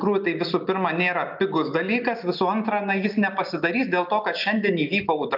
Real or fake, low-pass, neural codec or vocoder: real; 5.4 kHz; none